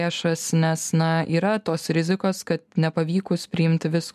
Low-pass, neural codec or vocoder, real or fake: 14.4 kHz; none; real